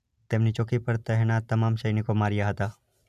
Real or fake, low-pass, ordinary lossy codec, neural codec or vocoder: real; 14.4 kHz; none; none